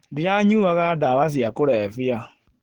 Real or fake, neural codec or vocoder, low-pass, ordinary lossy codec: fake; codec, 44.1 kHz, 7.8 kbps, DAC; 19.8 kHz; Opus, 16 kbps